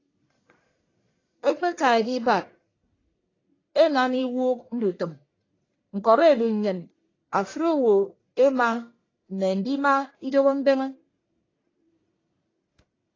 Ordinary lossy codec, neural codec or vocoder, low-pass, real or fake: AAC, 32 kbps; codec, 44.1 kHz, 1.7 kbps, Pupu-Codec; 7.2 kHz; fake